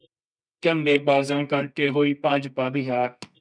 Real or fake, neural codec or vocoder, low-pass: fake; codec, 24 kHz, 0.9 kbps, WavTokenizer, medium music audio release; 9.9 kHz